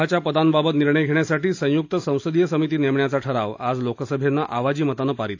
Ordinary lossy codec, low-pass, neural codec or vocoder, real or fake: AAC, 48 kbps; 7.2 kHz; none; real